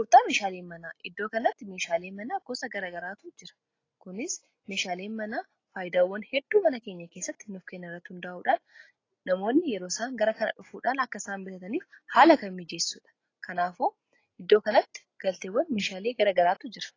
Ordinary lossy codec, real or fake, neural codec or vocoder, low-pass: AAC, 32 kbps; real; none; 7.2 kHz